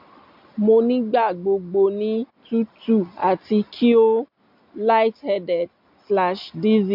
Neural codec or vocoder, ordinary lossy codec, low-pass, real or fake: none; MP3, 48 kbps; 5.4 kHz; real